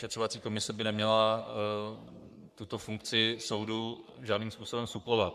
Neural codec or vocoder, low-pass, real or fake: codec, 44.1 kHz, 3.4 kbps, Pupu-Codec; 14.4 kHz; fake